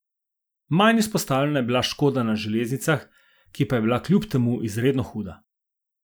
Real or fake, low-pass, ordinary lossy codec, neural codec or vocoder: real; none; none; none